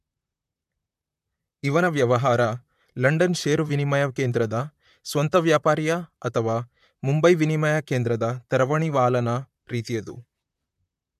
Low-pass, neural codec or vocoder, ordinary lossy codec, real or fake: 14.4 kHz; vocoder, 44.1 kHz, 128 mel bands, Pupu-Vocoder; MP3, 96 kbps; fake